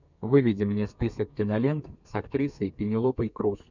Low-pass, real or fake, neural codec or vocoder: 7.2 kHz; fake; codec, 32 kHz, 1.9 kbps, SNAC